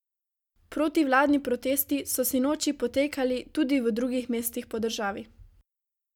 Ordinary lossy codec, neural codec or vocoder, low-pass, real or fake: none; none; 19.8 kHz; real